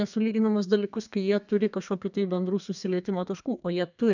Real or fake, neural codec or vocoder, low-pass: fake; codec, 32 kHz, 1.9 kbps, SNAC; 7.2 kHz